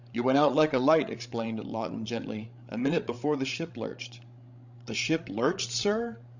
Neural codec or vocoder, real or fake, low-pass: codec, 16 kHz, 16 kbps, FreqCodec, larger model; fake; 7.2 kHz